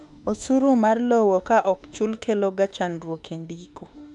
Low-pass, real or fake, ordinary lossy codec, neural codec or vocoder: 10.8 kHz; fake; none; autoencoder, 48 kHz, 32 numbers a frame, DAC-VAE, trained on Japanese speech